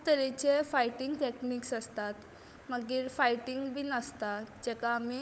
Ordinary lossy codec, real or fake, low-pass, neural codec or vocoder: none; fake; none; codec, 16 kHz, 16 kbps, FunCodec, trained on LibriTTS, 50 frames a second